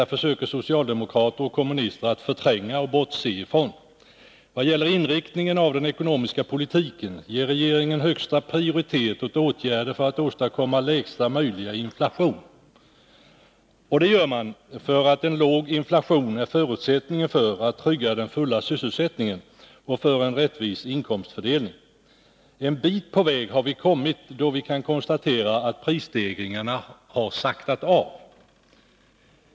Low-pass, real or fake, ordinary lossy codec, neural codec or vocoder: none; real; none; none